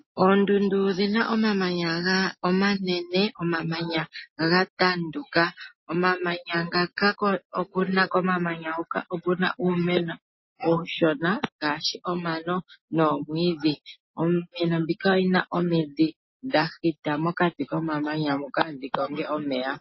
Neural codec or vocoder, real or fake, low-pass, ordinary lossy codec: none; real; 7.2 kHz; MP3, 24 kbps